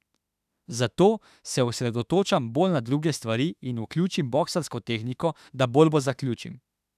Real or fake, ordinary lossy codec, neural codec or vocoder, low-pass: fake; none; autoencoder, 48 kHz, 32 numbers a frame, DAC-VAE, trained on Japanese speech; 14.4 kHz